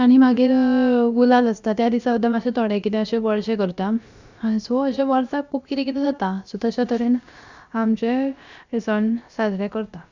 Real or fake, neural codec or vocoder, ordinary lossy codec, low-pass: fake; codec, 16 kHz, about 1 kbps, DyCAST, with the encoder's durations; Opus, 64 kbps; 7.2 kHz